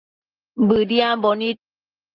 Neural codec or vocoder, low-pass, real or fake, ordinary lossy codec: none; 5.4 kHz; real; Opus, 24 kbps